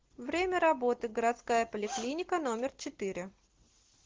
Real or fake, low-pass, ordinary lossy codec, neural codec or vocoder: real; 7.2 kHz; Opus, 16 kbps; none